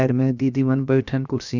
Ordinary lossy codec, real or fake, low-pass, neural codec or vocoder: none; fake; 7.2 kHz; codec, 16 kHz, 0.7 kbps, FocalCodec